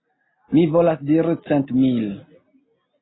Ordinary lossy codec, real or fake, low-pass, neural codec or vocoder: AAC, 16 kbps; real; 7.2 kHz; none